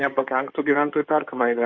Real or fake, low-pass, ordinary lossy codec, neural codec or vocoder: fake; 7.2 kHz; Opus, 64 kbps; codec, 16 kHz in and 24 kHz out, 2.2 kbps, FireRedTTS-2 codec